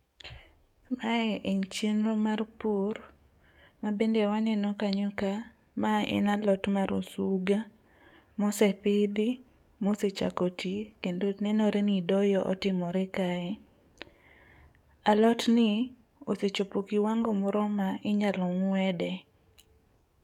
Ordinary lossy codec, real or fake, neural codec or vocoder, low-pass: MP3, 96 kbps; fake; codec, 44.1 kHz, 7.8 kbps, DAC; 19.8 kHz